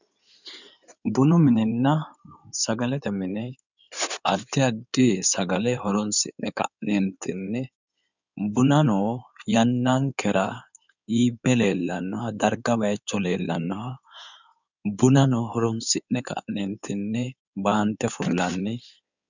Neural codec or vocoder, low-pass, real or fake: codec, 16 kHz in and 24 kHz out, 2.2 kbps, FireRedTTS-2 codec; 7.2 kHz; fake